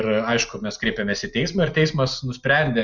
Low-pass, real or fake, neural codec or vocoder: 7.2 kHz; real; none